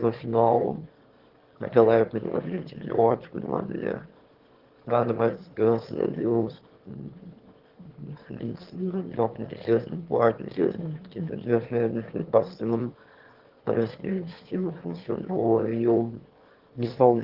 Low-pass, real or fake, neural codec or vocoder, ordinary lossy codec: 5.4 kHz; fake; autoencoder, 22.05 kHz, a latent of 192 numbers a frame, VITS, trained on one speaker; Opus, 16 kbps